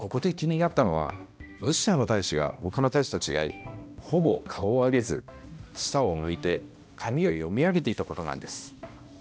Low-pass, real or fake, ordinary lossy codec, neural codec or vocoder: none; fake; none; codec, 16 kHz, 1 kbps, X-Codec, HuBERT features, trained on balanced general audio